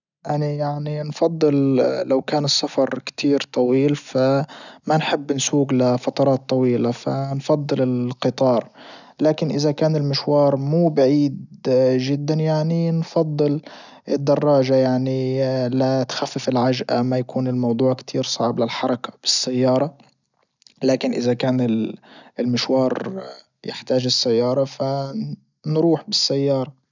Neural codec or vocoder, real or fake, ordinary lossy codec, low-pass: none; real; none; 7.2 kHz